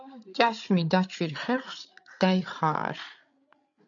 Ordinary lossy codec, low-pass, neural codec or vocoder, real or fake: MP3, 96 kbps; 7.2 kHz; codec, 16 kHz, 8 kbps, FreqCodec, larger model; fake